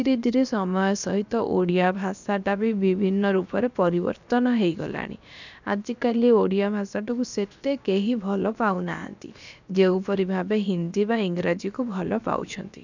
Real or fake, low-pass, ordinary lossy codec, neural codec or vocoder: fake; 7.2 kHz; none; codec, 16 kHz, about 1 kbps, DyCAST, with the encoder's durations